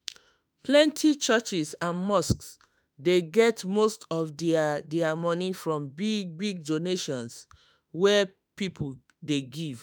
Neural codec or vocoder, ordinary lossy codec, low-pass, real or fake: autoencoder, 48 kHz, 32 numbers a frame, DAC-VAE, trained on Japanese speech; none; none; fake